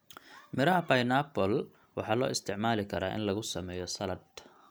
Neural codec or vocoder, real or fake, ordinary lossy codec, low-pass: none; real; none; none